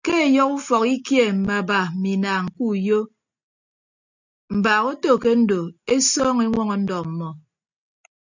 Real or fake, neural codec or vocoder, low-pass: real; none; 7.2 kHz